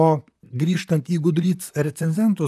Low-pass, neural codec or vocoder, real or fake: 14.4 kHz; vocoder, 44.1 kHz, 128 mel bands, Pupu-Vocoder; fake